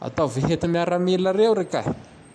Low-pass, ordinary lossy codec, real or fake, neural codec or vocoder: none; none; real; none